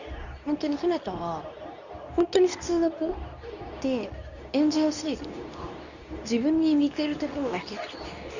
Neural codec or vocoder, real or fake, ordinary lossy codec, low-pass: codec, 24 kHz, 0.9 kbps, WavTokenizer, medium speech release version 2; fake; none; 7.2 kHz